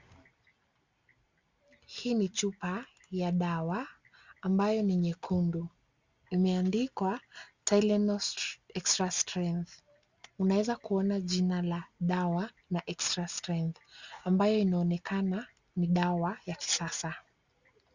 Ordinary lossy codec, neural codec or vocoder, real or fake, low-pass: Opus, 64 kbps; none; real; 7.2 kHz